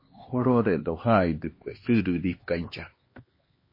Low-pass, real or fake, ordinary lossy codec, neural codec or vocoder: 5.4 kHz; fake; MP3, 24 kbps; codec, 16 kHz, 2 kbps, X-Codec, HuBERT features, trained on LibriSpeech